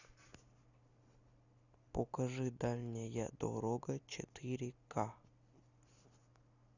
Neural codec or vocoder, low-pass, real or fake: none; 7.2 kHz; real